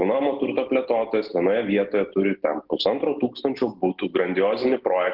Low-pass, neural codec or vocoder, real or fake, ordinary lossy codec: 5.4 kHz; none; real; Opus, 16 kbps